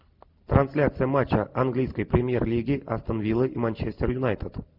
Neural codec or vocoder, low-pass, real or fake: none; 5.4 kHz; real